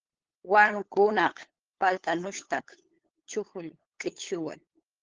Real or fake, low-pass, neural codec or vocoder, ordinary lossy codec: fake; 7.2 kHz; codec, 16 kHz, 8 kbps, FunCodec, trained on LibriTTS, 25 frames a second; Opus, 16 kbps